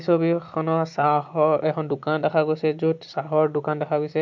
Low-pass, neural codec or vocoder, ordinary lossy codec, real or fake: 7.2 kHz; none; MP3, 64 kbps; real